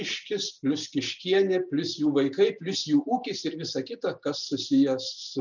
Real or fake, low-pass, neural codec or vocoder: real; 7.2 kHz; none